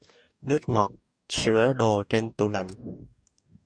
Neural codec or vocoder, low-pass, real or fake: codec, 44.1 kHz, 2.6 kbps, DAC; 9.9 kHz; fake